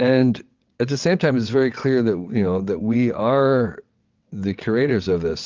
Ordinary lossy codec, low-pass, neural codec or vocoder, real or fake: Opus, 32 kbps; 7.2 kHz; vocoder, 22.05 kHz, 80 mel bands, WaveNeXt; fake